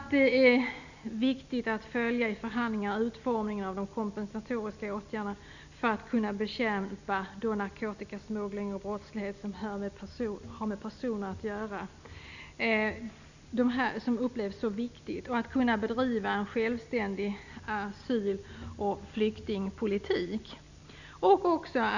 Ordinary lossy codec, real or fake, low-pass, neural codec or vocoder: none; real; 7.2 kHz; none